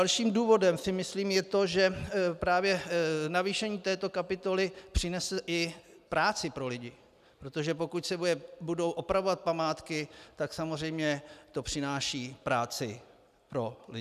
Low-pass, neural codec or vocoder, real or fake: 14.4 kHz; none; real